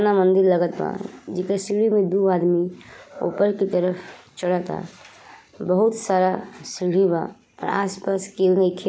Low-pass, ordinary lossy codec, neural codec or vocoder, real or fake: none; none; none; real